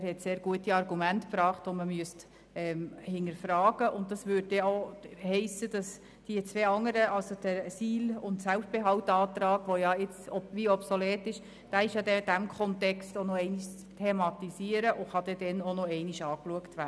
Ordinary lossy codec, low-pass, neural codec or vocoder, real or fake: none; none; none; real